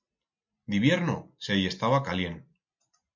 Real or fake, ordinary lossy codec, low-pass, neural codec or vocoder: real; MP3, 48 kbps; 7.2 kHz; none